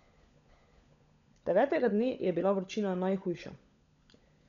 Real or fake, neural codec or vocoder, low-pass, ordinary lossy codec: fake; codec, 16 kHz, 16 kbps, FunCodec, trained on LibriTTS, 50 frames a second; 7.2 kHz; none